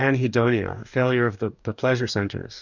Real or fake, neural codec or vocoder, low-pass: fake; codec, 44.1 kHz, 2.6 kbps, DAC; 7.2 kHz